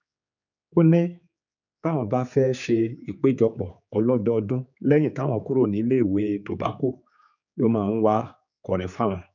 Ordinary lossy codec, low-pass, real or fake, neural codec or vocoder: none; 7.2 kHz; fake; codec, 16 kHz, 4 kbps, X-Codec, HuBERT features, trained on general audio